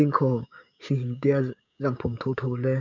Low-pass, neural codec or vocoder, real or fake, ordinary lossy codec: 7.2 kHz; codec, 16 kHz, 6 kbps, DAC; fake; none